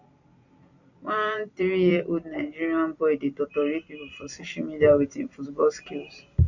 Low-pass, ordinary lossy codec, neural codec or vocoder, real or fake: 7.2 kHz; none; vocoder, 44.1 kHz, 128 mel bands every 256 samples, BigVGAN v2; fake